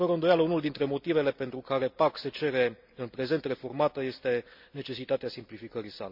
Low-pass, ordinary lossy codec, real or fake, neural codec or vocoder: 5.4 kHz; none; real; none